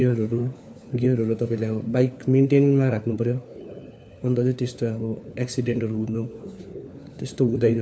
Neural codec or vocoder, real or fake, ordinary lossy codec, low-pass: codec, 16 kHz, 4 kbps, FunCodec, trained on LibriTTS, 50 frames a second; fake; none; none